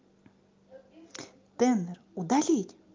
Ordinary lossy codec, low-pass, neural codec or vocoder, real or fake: Opus, 32 kbps; 7.2 kHz; none; real